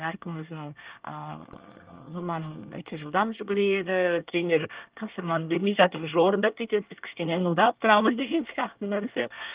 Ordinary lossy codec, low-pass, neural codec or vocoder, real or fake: Opus, 24 kbps; 3.6 kHz; codec, 24 kHz, 1 kbps, SNAC; fake